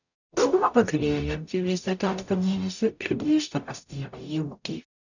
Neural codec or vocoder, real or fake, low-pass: codec, 44.1 kHz, 0.9 kbps, DAC; fake; 7.2 kHz